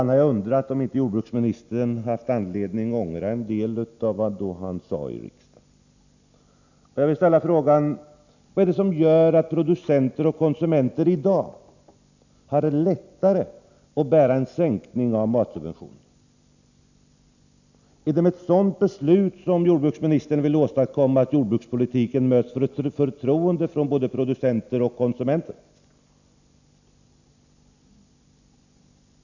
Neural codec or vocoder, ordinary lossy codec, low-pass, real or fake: none; none; 7.2 kHz; real